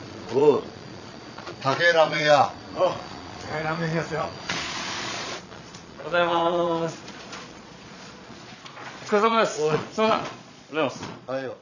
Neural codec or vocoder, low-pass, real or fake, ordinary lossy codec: vocoder, 22.05 kHz, 80 mel bands, Vocos; 7.2 kHz; fake; none